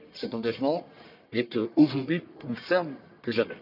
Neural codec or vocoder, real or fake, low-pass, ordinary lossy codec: codec, 44.1 kHz, 1.7 kbps, Pupu-Codec; fake; 5.4 kHz; none